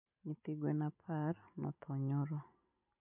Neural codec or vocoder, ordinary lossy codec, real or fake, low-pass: none; none; real; 3.6 kHz